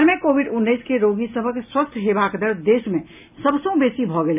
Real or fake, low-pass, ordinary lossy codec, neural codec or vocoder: real; 3.6 kHz; none; none